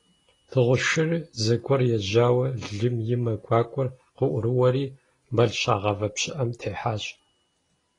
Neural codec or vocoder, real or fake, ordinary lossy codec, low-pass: none; real; AAC, 32 kbps; 10.8 kHz